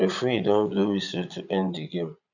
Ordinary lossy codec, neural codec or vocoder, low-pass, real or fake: none; vocoder, 22.05 kHz, 80 mel bands, Vocos; 7.2 kHz; fake